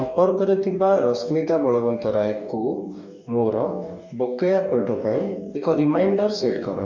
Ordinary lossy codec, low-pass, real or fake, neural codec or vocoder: MP3, 64 kbps; 7.2 kHz; fake; codec, 44.1 kHz, 2.6 kbps, DAC